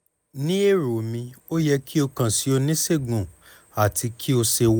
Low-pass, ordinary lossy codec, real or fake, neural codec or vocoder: none; none; real; none